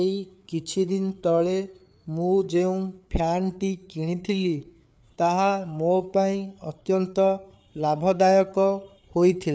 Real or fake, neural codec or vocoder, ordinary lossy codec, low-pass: fake; codec, 16 kHz, 4 kbps, FreqCodec, larger model; none; none